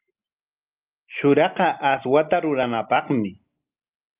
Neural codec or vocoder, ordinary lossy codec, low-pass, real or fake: none; Opus, 24 kbps; 3.6 kHz; real